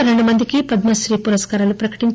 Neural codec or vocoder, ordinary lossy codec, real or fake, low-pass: none; none; real; none